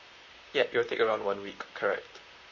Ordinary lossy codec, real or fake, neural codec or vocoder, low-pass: MP3, 32 kbps; fake; vocoder, 44.1 kHz, 128 mel bands, Pupu-Vocoder; 7.2 kHz